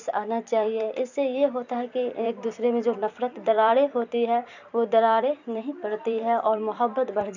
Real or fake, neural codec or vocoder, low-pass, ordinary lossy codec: fake; vocoder, 44.1 kHz, 128 mel bands every 256 samples, BigVGAN v2; 7.2 kHz; none